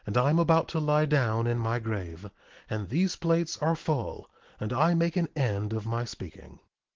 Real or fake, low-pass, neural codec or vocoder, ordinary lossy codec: real; 7.2 kHz; none; Opus, 16 kbps